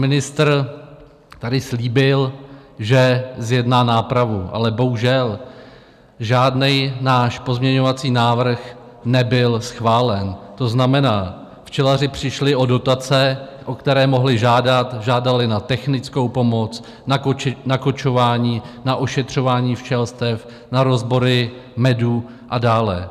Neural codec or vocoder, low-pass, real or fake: none; 14.4 kHz; real